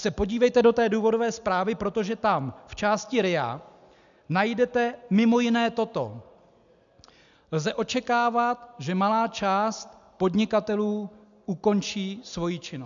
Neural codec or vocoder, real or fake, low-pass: none; real; 7.2 kHz